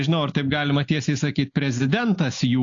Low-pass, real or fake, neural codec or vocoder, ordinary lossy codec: 7.2 kHz; real; none; AAC, 48 kbps